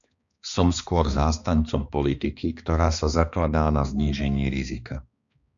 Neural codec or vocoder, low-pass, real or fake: codec, 16 kHz, 2 kbps, X-Codec, HuBERT features, trained on balanced general audio; 7.2 kHz; fake